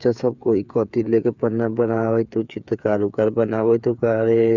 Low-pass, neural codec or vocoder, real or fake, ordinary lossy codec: 7.2 kHz; codec, 16 kHz, 8 kbps, FreqCodec, smaller model; fake; none